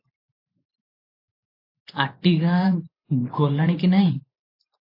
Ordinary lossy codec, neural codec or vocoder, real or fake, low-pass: AAC, 32 kbps; vocoder, 44.1 kHz, 128 mel bands every 512 samples, BigVGAN v2; fake; 5.4 kHz